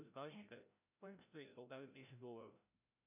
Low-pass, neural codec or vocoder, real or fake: 3.6 kHz; codec, 16 kHz, 0.5 kbps, FreqCodec, larger model; fake